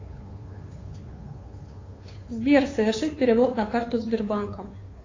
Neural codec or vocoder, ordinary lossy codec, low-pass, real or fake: codec, 16 kHz, 2 kbps, FunCodec, trained on Chinese and English, 25 frames a second; AAC, 32 kbps; 7.2 kHz; fake